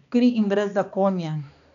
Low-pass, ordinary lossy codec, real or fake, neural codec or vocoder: 7.2 kHz; none; fake; codec, 16 kHz, 2 kbps, X-Codec, HuBERT features, trained on balanced general audio